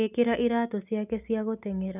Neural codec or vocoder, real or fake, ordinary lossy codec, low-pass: none; real; none; 3.6 kHz